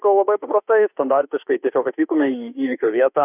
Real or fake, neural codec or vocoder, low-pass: fake; autoencoder, 48 kHz, 32 numbers a frame, DAC-VAE, trained on Japanese speech; 3.6 kHz